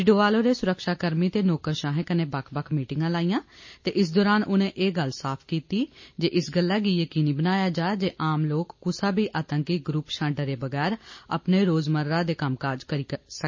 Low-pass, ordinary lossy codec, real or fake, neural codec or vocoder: 7.2 kHz; MP3, 32 kbps; real; none